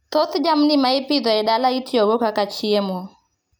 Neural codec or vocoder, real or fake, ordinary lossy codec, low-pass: none; real; none; none